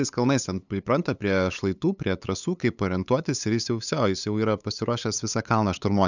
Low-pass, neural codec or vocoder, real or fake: 7.2 kHz; codec, 16 kHz, 8 kbps, FunCodec, trained on LibriTTS, 25 frames a second; fake